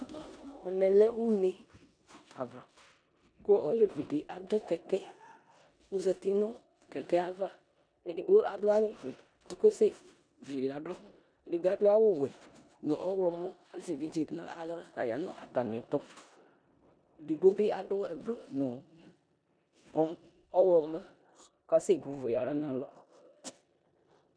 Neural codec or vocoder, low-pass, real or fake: codec, 16 kHz in and 24 kHz out, 0.9 kbps, LongCat-Audio-Codec, four codebook decoder; 9.9 kHz; fake